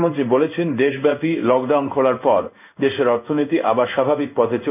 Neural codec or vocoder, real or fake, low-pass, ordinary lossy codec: codec, 16 kHz in and 24 kHz out, 1 kbps, XY-Tokenizer; fake; 3.6 kHz; none